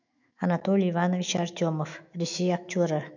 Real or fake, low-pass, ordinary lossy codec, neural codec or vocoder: fake; 7.2 kHz; none; autoencoder, 48 kHz, 128 numbers a frame, DAC-VAE, trained on Japanese speech